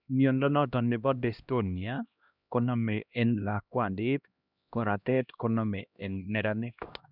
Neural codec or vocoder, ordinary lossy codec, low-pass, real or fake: codec, 16 kHz, 1 kbps, X-Codec, HuBERT features, trained on LibriSpeech; none; 5.4 kHz; fake